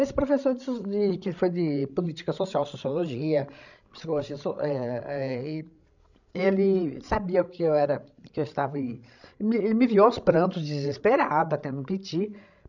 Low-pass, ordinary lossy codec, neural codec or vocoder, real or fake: 7.2 kHz; none; codec, 16 kHz, 16 kbps, FreqCodec, larger model; fake